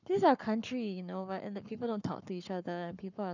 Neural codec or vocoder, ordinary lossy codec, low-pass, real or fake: codec, 44.1 kHz, 7.8 kbps, Pupu-Codec; none; 7.2 kHz; fake